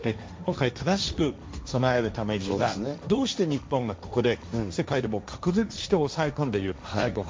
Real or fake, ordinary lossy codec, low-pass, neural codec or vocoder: fake; none; none; codec, 16 kHz, 1.1 kbps, Voila-Tokenizer